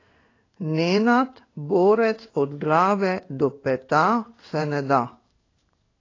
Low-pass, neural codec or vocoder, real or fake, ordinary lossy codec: 7.2 kHz; codec, 16 kHz in and 24 kHz out, 1 kbps, XY-Tokenizer; fake; AAC, 32 kbps